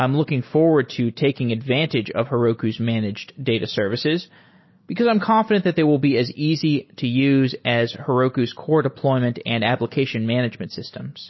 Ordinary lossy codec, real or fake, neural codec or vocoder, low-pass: MP3, 24 kbps; real; none; 7.2 kHz